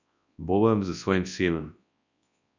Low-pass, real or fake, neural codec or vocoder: 7.2 kHz; fake; codec, 24 kHz, 0.9 kbps, WavTokenizer, large speech release